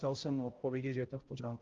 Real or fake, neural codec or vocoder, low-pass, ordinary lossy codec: fake; codec, 16 kHz, 0.5 kbps, X-Codec, HuBERT features, trained on balanced general audio; 7.2 kHz; Opus, 24 kbps